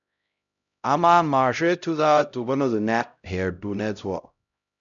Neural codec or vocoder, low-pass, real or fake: codec, 16 kHz, 0.5 kbps, X-Codec, HuBERT features, trained on LibriSpeech; 7.2 kHz; fake